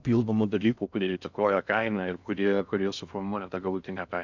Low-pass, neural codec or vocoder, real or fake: 7.2 kHz; codec, 16 kHz in and 24 kHz out, 0.6 kbps, FocalCodec, streaming, 4096 codes; fake